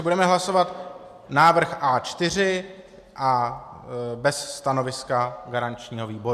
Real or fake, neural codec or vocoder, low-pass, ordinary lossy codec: real; none; 14.4 kHz; MP3, 96 kbps